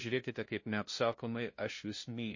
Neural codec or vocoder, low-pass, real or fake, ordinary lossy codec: codec, 16 kHz, 0.5 kbps, FunCodec, trained on LibriTTS, 25 frames a second; 7.2 kHz; fake; MP3, 32 kbps